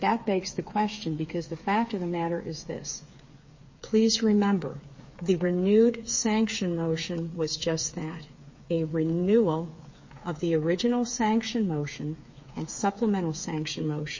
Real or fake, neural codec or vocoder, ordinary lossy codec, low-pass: fake; codec, 16 kHz, 8 kbps, FreqCodec, smaller model; MP3, 32 kbps; 7.2 kHz